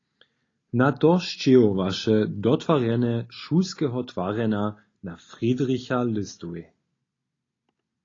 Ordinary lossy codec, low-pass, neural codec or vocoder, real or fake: AAC, 32 kbps; 7.2 kHz; none; real